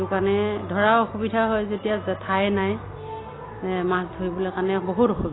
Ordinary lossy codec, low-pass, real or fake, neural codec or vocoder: AAC, 16 kbps; 7.2 kHz; real; none